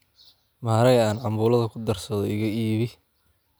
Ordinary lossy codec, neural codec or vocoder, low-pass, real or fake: none; none; none; real